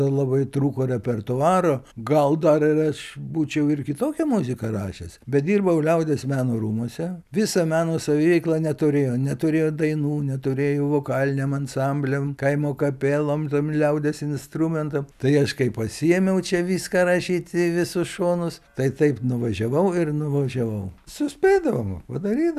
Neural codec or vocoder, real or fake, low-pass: none; real; 14.4 kHz